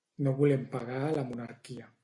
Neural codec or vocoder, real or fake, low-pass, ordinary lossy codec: none; real; 10.8 kHz; AAC, 64 kbps